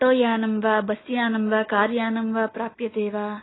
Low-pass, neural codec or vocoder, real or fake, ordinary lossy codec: 7.2 kHz; none; real; AAC, 16 kbps